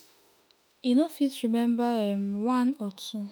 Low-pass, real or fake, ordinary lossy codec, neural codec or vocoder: none; fake; none; autoencoder, 48 kHz, 32 numbers a frame, DAC-VAE, trained on Japanese speech